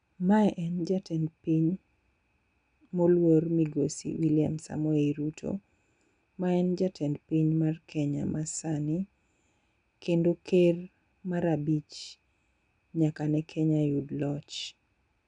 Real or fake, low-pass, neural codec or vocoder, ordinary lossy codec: real; 9.9 kHz; none; MP3, 96 kbps